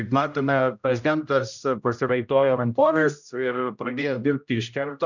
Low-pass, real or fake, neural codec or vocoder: 7.2 kHz; fake; codec, 16 kHz, 0.5 kbps, X-Codec, HuBERT features, trained on general audio